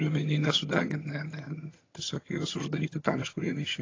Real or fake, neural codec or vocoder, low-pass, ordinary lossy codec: fake; vocoder, 22.05 kHz, 80 mel bands, HiFi-GAN; 7.2 kHz; AAC, 32 kbps